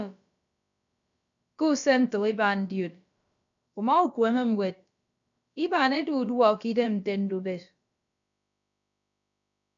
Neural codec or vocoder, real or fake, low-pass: codec, 16 kHz, about 1 kbps, DyCAST, with the encoder's durations; fake; 7.2 kHz